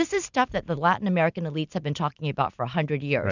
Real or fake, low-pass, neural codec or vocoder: real; 7.2 kHz; none